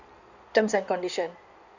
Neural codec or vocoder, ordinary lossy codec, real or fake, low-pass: codec, 16 kHz in and 24 kHz out, 2.2 kbps, FireRedTTS-2 codec; none; fake; 7.2 kHz